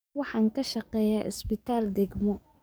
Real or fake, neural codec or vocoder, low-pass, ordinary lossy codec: fake; codec, 44.1 kHz, 7.8 kbps, DAC; none; none